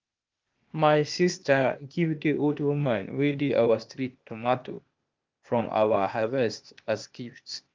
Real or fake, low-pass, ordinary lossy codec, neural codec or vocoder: fake; 7.2 kHz; Opus, 24 kbps; codec, 16 kHz, 0.8 kbps, ZipCodec